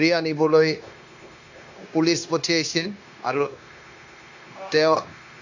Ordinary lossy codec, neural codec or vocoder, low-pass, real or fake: AAC, 48 kbps; codec, 16 kHz, 0.9 kbps, LongCat-Audio-Codec; 7.2 kHz; fake